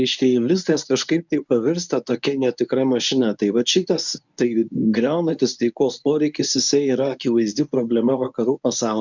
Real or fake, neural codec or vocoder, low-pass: fake; codec, 24 kHz, 0.9 kbps, WavTokenizer, medium speech release version 1; 7.2 kHz